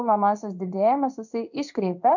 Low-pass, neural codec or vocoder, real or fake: 7.2 kHz; codec, 16 kHz in and 24 kHz out, 1 kbps, XY-Tokenizer; fake